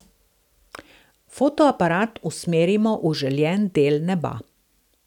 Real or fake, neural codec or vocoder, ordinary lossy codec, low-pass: real; none; none; 19.8 kHz